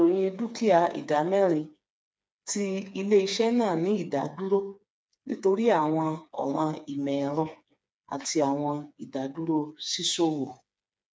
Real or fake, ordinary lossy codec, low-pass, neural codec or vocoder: fake; none; none; codec, 16 kHz, 4 kbps, FreqCodec, smaller model